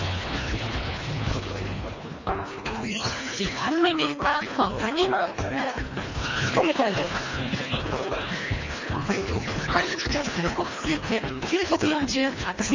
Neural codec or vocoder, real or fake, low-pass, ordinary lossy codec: codec, 24 kHz, 1.5 kbps, HILCodec; fake; 7.2 kHz; MP3, 32 kbps